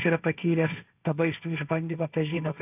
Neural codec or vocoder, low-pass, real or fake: codec, 16 kHz, 1.1 kbps, Voila-Tokenizer; 3.6 kHz; fake